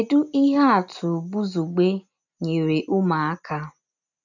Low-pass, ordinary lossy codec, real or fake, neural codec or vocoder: 7.2 kHz; none; real; none